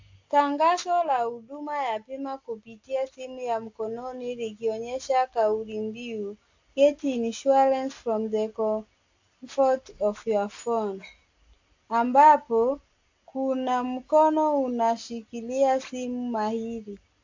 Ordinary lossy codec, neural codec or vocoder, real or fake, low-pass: AAC, 48 kbps; none; real; 7.2 kHz